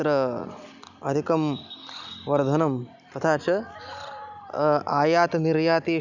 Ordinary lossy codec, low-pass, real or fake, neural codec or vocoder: none; 7.2 kHz; real; none